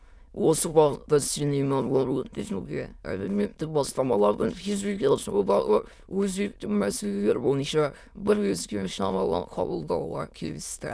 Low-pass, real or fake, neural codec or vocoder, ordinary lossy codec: none; fake; autoencoder, 22.05 kHz, a latent of 192 numbers a frame, VITS, trained on many speakers; none